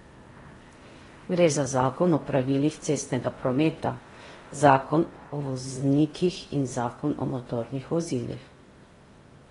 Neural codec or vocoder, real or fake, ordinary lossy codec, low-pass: codec, 16 kHz in and 24 kHz out, 0.8 kbps, FocalCodec, streaming, 65536 codes; fake; AAC, 32 kbps; 10.8 kHz